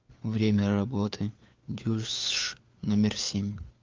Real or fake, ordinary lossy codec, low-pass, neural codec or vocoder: fake; Opus, 32 kbps; 7.2 kHz; codec, 16 kHz, 4 kbps, FunCodec, trained on LibriTTS, 50 frames a second